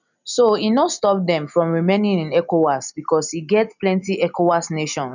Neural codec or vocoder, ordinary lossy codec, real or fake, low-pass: none; none; real; 7.2 kHz